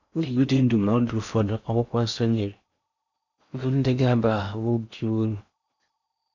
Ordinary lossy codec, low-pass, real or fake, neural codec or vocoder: none; 7.2 kHz; fake; codec, 16 kHz in and 24 kHz out, 0.6 kbps, FocalCodec, streaming, 4096 codes